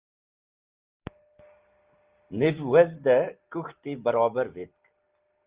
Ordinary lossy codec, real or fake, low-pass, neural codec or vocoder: Opus, 24 kbps; real; 3.6 kHz; none